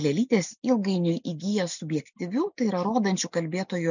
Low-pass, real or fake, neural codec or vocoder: 7.2 kHz; real; none